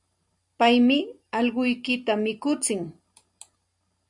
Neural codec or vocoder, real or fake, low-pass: none; real; 10.8 kHz